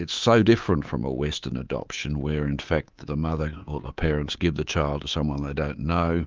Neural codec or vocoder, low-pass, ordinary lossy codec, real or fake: codec, 24 kHz, 3.1 kbps, DualCodec; 7.2 kHz; Opus, 32 kbps; fake